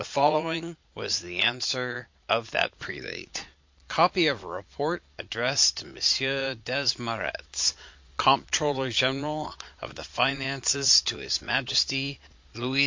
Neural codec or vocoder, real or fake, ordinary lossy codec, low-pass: vocoder, 22.05 kHz, 80 mel bands, Vocos; fake; MP3, 48 kbps; 7.2 kHz